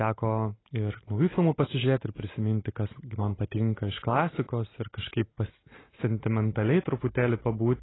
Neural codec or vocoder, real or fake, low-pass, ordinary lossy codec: none; real; 7.2 kHz; AAC, 16 kbps